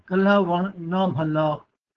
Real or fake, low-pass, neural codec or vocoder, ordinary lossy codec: fake; 7.2 kHz; codec, 16 kHz, 4.8 kbps, FACodec; Opus, 16 kbps